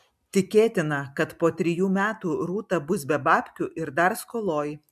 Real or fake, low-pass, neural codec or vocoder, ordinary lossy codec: real; 14.4 kHz; none; MP3, 96 kbps